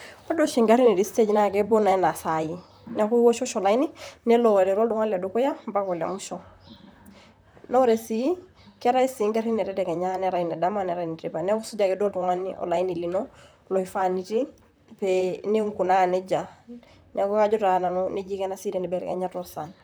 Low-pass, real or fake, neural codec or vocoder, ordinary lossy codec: none; fake; vocoder, 44.1 kHz, 128 mel bands, Pupu-Vocoder; none